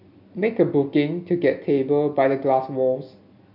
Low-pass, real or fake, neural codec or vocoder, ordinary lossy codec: 5.4 kHz; real; none; none